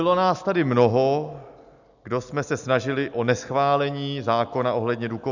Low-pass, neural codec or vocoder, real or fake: 7.2 kHz; none; real